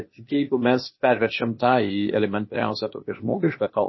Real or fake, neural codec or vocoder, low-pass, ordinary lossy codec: fake; codec, 16 kHz, 0.8 kbps, ZipCodec; 7.2 kHz; MP3, 24 kbps